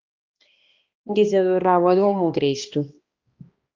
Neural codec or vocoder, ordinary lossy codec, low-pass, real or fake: codec, 16 kHz, 1 kbps, X-Codec, HuBERT features, trained on balanced general audio; Opus, 24 kbps; 7.2 kHz; fake